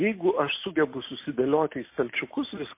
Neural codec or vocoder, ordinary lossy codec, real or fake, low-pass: none; MP3, 24 kbps; real; 3.6 kHz